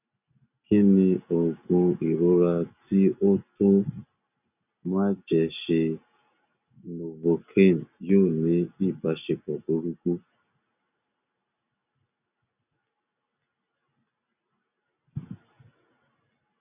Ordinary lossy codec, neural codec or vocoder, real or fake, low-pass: none; none; real; 3.6 kHz